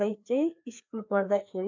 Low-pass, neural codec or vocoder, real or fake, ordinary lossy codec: 7.2 kHz; codec, 16 kHz, 2 kbps, FreqCodec, larger model; fake; MP3, 64 kbps